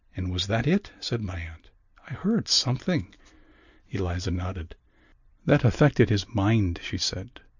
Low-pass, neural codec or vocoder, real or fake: 7.2 kHz; none; real